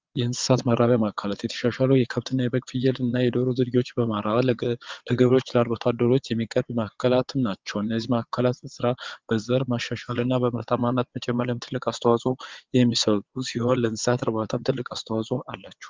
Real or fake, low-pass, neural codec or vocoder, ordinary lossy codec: fake; 7.2 kHz; vocoder, 22.05 kHz, 80 mel bands, WaveNeXt; Opus, 32 kbps